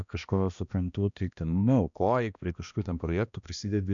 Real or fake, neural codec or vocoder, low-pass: fake; codec, 16 kHz, 1 kbps, X-Codec, HuBERT features, trained on balanced general audio; 7.2 kHz